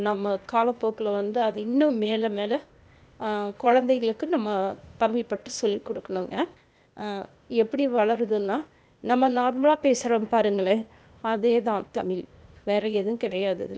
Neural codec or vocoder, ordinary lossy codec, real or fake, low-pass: codec, 16 kHz, 0.8 kbps, ZipCodec; none; fake; none